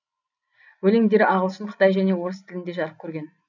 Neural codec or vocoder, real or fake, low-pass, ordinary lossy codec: none; real; 7.2 kHz; none